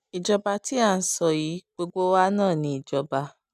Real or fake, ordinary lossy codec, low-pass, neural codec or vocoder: real; none; 14.4 kHz; none